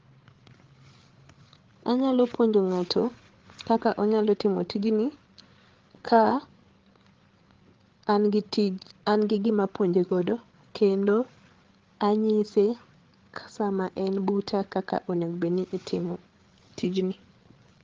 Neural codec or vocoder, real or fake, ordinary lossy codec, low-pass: codec, 16 kHz, 8 kbps, FreqCodec, larger model; fake; Opus, 16 kbps; 7.2 kHz